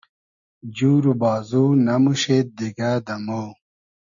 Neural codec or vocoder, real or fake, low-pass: none; real; 7.2 kHz